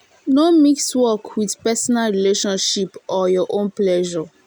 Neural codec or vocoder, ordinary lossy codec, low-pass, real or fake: none; none; none; real